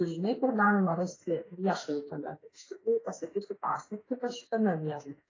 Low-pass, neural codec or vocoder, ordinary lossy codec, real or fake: 7.2 kHz; autoencoder, 48 kHz, 32 numbers a frame, DAC-VAE, trained on Japanese speech; AAC, 32 kbps; fake